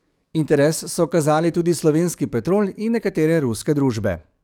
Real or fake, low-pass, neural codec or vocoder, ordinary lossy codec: fake; 19.8 kHz; codec, 44.1 kHz, 7.8 kbps, DAC; none